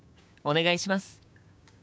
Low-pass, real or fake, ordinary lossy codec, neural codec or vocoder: none; fake; none; codec, 16 kHz, 6 kbps, DAC